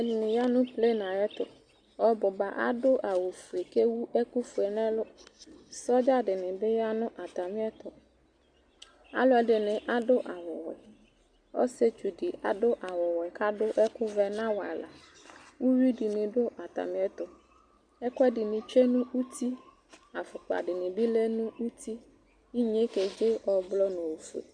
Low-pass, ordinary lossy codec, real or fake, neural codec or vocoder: 9.9 kHz; Opus, 64 kbps; real; none